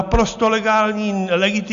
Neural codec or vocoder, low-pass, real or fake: none; 7.2 kHz; real